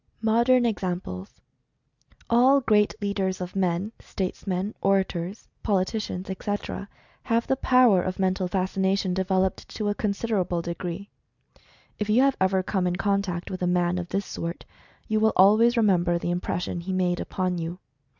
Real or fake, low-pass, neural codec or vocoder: real; 7.2 kHz; none